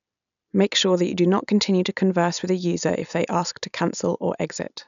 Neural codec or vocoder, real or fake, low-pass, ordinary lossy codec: none; real; 7.2 kHz; none